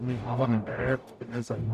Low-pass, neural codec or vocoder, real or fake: 14.4 kHz; codec, 44.1 kHz, 0.9 kbps, DAC; fake